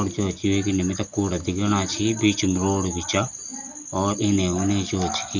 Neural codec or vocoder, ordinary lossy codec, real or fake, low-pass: none; none; real; 7.2 kHz